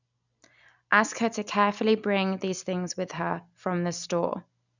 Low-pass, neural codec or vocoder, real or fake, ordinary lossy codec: 7.2 kHz; none; real; none